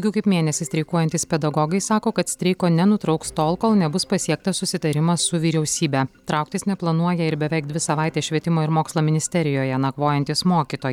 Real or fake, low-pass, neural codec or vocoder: real; 19.8 kHz; none